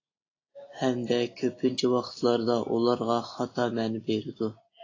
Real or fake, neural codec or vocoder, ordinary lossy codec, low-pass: real; none; AAC, 32 kbps; 7.2 kHz